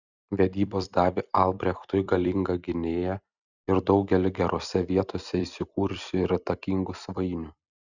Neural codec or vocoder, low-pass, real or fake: vocoder, 44.1 kHz, 128 mel bands every 256 samples, BigVGAN v2; 7.2 kHz; fake